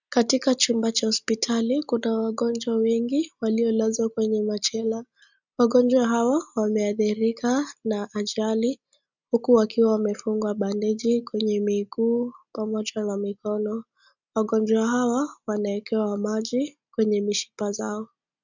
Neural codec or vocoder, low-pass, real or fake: none; 7.2 kHz; real